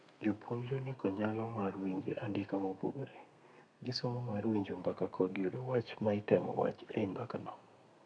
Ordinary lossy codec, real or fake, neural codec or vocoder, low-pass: none; fake; codec, 32 kHz, 1.9 kbps, SNAC; 9.9 kHz